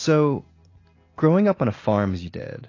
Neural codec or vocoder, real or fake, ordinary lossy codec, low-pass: none; real; AAC, 32 kbps; 7.2 kHz